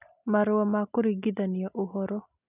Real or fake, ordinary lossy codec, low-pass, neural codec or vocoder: real; none; 3.6 kHz; none